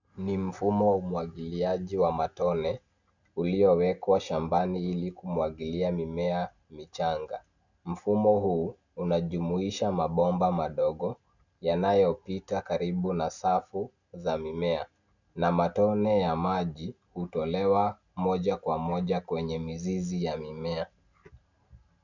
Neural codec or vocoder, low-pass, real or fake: none; 7.2 kHz; real